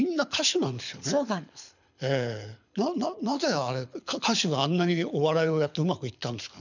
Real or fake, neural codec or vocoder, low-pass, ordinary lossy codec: fake; codec, 24 kHz, 6 kbps, HILCodec; 7.2 kHz; none